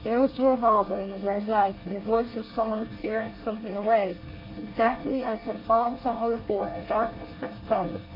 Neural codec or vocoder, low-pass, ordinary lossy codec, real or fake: codec, 24 kHz, 1 kbps, SNAC; 5.4 kHz; AAC, 32 kbps; fake